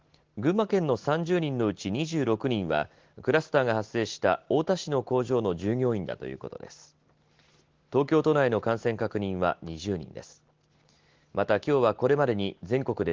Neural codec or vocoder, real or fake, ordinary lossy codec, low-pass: none; real; Opus, 16 kbps; 7.2 kHz